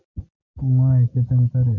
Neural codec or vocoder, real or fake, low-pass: none; real; 7.2 kHz